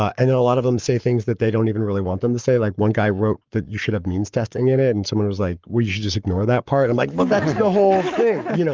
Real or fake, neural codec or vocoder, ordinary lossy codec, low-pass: fake; codec, 44.1 kHz, 7.8 kbps, Pupu-Codec; Opus, 32 kbps; 7.2 kHz